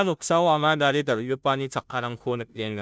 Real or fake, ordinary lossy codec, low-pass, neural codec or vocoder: fake; none; none; codec, 16 kHz, 0.5 kbps, FunCodec, trained on Chinese and English, 25 frames a second